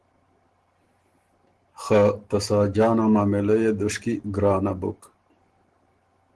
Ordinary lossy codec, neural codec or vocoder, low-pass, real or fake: Opus, 16 kbps; none; 10.8 kHz; real